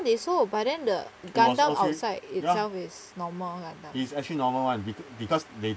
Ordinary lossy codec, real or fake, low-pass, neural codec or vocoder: none; real; none; none